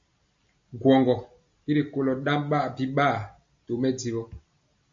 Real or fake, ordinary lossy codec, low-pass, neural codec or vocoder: real; MP3, 48 kbps; 7.2 kHz; none